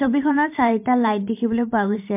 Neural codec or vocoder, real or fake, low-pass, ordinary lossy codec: vocoder, 44.1 kHz, 128 mel bands every 512 samples, BigVGAN v2; fake; 3.6 kHz; MP3, 32 kbps